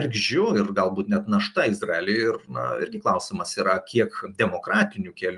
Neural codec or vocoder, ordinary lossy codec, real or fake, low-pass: none; Opus, 32 kbps; real; 10.8 kHz